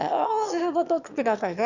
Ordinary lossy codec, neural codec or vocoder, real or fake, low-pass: none; autoencoder, 22.05 kHz, a latent of 192 numbers a frame, VITS, trained on one speaker; fake; 7.2 kHz